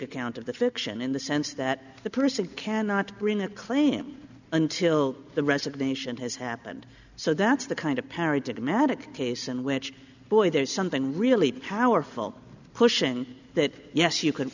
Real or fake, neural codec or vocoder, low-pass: real; none; 7.2 kHz